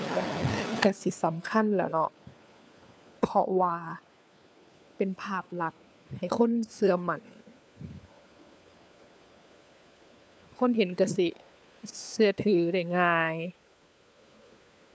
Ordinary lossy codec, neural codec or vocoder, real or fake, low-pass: none; codec, 16 kHz, 4 kbps, FunCodec, trained on LibriTTS, 50 frames a second; fake; none